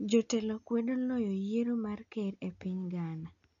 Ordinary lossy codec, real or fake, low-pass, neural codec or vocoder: none; real; 7.2 kHz; none